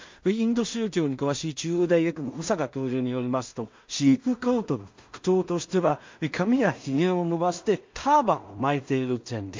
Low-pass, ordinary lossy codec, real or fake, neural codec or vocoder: 7.2 kHz; MP3, 48 kbps; fake; codec, 16 kHz in and 24 kHz out, 0.4 kbps, LongCat-Audio-Codec, two codebook decoder